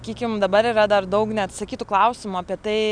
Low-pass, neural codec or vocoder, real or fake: 9.9 kHz; none; real